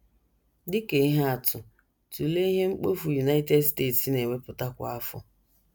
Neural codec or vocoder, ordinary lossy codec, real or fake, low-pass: none; none; real; none